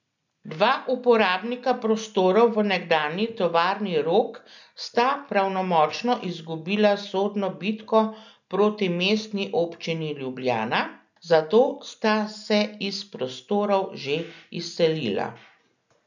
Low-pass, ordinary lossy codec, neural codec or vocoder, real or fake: 7.2 kHz; none; none; real